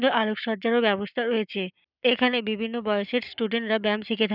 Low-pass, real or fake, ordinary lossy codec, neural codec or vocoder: 5.4 kHz; fake; none; codec, 44.1 kHz, 7.8 kbps, Pupu-Codec